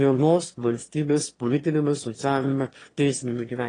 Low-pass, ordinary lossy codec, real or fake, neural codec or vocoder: 9.9 kHz; AAC, 32 kbps; fake; autoencoder, 22.05 kHz, a latent of 192 numbers a frame, VITS, trained on one speaker